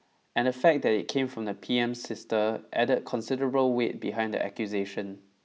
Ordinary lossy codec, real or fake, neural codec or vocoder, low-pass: none; real; none; none